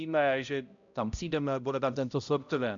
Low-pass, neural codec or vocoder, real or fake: 7.2 kHz; codec, 16 kHz, 0.5 kbps, X-Codec, HuBERT features, trained on balanced general audio; fake